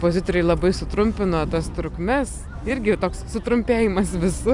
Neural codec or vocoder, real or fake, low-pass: vocoder, 44.1 kHz, 128 mel bands every 256 samples, BigVGAN v2; fake; 10.8 kHz